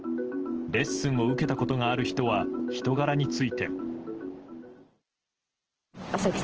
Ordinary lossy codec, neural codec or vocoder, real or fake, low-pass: Opus, 16 kbps; none; real; 7.2 kHz